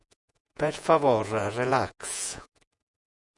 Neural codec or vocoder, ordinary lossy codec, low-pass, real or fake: vocoder, 48 kHz, 128 mel bands, Vocos; MP3, 96 kbps; 10.8 kHz; fake